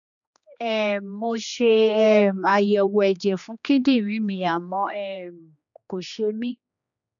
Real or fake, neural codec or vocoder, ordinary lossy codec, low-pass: fake; codec, 16 kHz, 2 kbps, X-Codec, HuBERT features, trained on general audio; none; 7.2 kHz